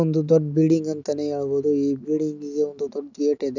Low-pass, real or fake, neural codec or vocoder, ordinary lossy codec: 7.2 kHz; real; none; none